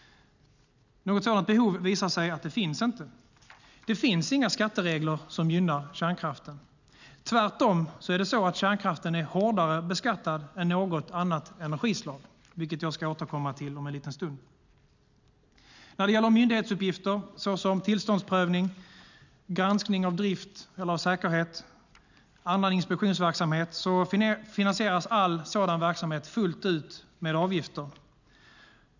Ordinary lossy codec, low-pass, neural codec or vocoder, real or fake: none; 7.2 kHz; none; real